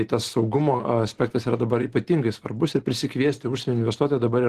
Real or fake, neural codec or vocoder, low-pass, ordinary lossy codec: real; none; 14.4 kHz; Opus, 16 kbps